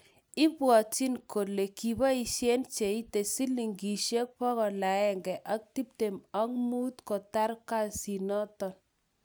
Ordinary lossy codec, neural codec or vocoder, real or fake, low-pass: none; none; real; none